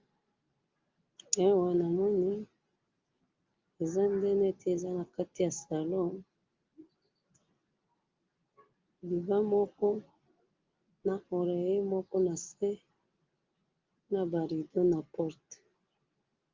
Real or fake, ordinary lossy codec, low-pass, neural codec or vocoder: real; Opus, 32 kbps; 7.2 kHz; none